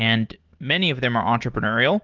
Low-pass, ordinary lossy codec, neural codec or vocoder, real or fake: 7.2 kHz; Opus, 16 kbps; none; real